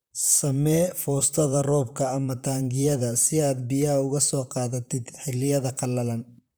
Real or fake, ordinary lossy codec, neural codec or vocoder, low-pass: fake; none; vocoder, 44.1 kHz, 128 mel bands, Pupu-Vocoder; none